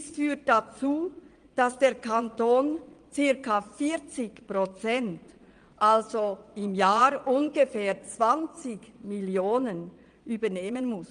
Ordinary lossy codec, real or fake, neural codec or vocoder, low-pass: Opus, 32 kbps; fake; vocoder, 22.05 kHz, 80 mel bands, Vocos; 9.9 kHz